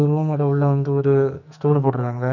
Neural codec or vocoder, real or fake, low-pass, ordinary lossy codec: codec, 44.1 kHz, 2.6 kbps, SNAC; fake; 7.2 kHz; none